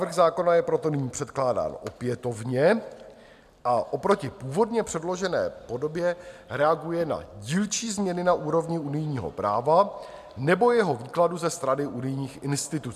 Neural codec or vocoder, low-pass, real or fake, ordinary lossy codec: none; 14.4 kHz; real; MP3, 96 kbps